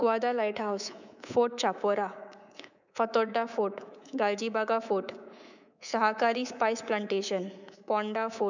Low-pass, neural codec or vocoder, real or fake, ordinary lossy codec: 7.2 kHz; codec, 24 kHz, 3.1 kbps, DualCodec; fake; none